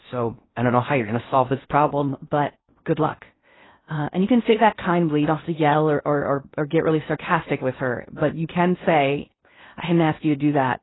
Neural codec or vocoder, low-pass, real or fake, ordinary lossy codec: codec, 16 kHz in and 24 kHz out, 0.6 kbps, FocalCodec, streaming, 4096 codes; 7.2 kHz; fake; AAC, 16 kbps